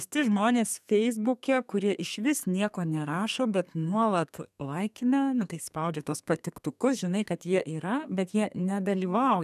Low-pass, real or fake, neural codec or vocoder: 14.4 kHz; fake; codec, 32 kHz, 1.9 kbps, SNAC